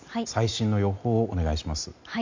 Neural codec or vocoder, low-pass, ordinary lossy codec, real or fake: none; 7.2 kHz; MP3, 64 kbps; real